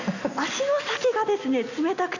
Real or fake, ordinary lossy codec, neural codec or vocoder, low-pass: real; none; none; 7.2 kHz